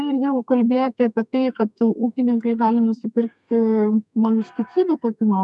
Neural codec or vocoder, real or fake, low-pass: codec, 32 kHz, 1.9 kbps, SNAC; fake; 10.8 kHz